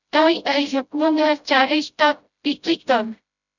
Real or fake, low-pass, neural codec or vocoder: fake; 7.2 kHz; codec, 16 kHz, 0.5 kbps, FreqCodec, smaller model